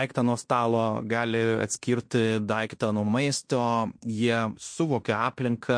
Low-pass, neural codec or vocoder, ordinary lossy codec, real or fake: 9.9 kHz; codec, 16 kHz in and 24 kHz out, 0.9 kbps, LongCat-Audio-Codec, fine tuned four codebook decoder; MP3, 48 kbps; fake